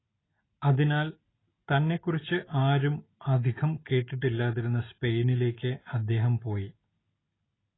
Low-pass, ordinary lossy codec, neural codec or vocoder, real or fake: 7.2 kHz; AAC, 16 kbps; none; real